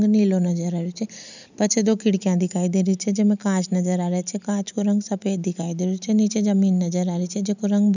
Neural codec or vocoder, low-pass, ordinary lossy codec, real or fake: none; 7.2 kHz; none; real